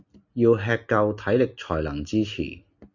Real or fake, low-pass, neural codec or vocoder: real; 7.2 kHz; none